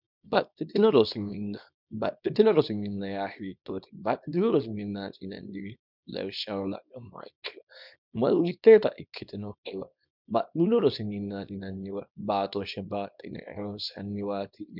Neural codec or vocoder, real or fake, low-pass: codec, 24 kHz, 0.9 kbps, WavTokenizer, small release; fake; 5.4 kHz